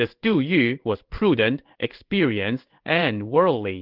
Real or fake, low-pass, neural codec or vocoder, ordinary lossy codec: fake; 5.4 kHz; codec, 16 kHz in and 24 kHz out, 1 kbps, XY-Tokenizer; Opus, 16 kbps